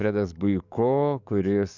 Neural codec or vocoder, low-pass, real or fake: codec, 44.1 kHz, 7.8 kbps, DAC; 7.2 kHz; fake